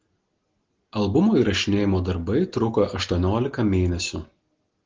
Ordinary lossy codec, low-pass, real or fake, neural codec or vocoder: Opus, 16 kbps; 7.2 kHz; real; none